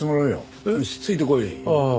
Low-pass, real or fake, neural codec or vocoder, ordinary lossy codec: none; real; none; none